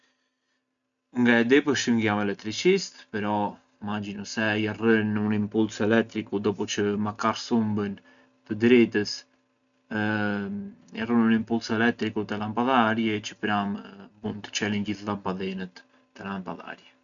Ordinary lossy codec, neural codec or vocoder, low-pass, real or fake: none; none; 7.2 kHz; real